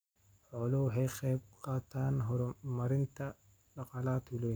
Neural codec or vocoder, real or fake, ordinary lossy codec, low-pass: none; real; none; none